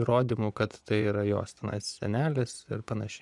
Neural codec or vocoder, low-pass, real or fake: vocoder, 24 kHz, 100 mel bands, Vocos; 10.8 kHz; fake